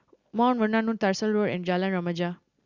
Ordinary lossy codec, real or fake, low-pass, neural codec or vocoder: Opus, 64 kbps; real; 7.2 kHz; none